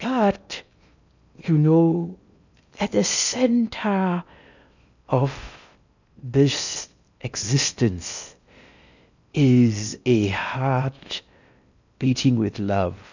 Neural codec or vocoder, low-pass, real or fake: codec, 16 kHz in and 24 kHz out, 0.6 kbps, FocalCodec, streaming, 2048 codes; 7.2 kHz; fake